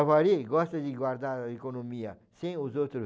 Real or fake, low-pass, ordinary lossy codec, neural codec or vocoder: real; none; none; none